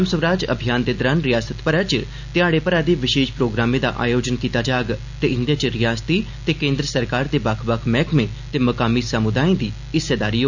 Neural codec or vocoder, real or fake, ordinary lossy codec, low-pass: none; real; none; 7.2 kHz